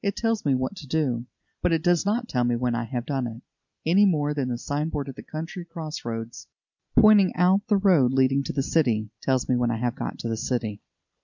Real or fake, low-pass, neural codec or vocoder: real; 7.2 kHz; none